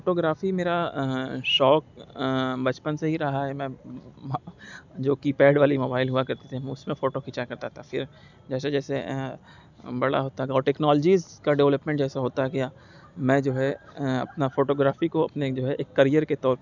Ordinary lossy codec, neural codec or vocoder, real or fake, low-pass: none; none; real; 7.2 kHz